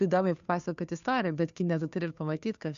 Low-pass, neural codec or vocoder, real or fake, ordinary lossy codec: 7.2 kHz; codec, 16 kHz, 2 kbps, FunCodec, trained on Chinese and English, 25 frames a second; fake; MP3, 64 kbps